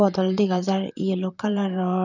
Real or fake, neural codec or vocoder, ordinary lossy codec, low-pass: fake; vocoder, 44.1 kHz, 128 mel bands, Pupu-Vocoder; none; 7.2 kHz